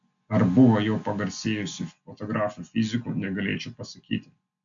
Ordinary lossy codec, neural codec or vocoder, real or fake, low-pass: MP3, 64 kbps; none; real; 7.2 kHz